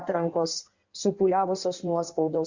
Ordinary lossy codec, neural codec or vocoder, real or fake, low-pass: Opus, 64 kbps; codec, 16 kHz in and 24 kHz out, 1.1 kbps, FireRedTTS-2 codec; fake; 7.2 kHz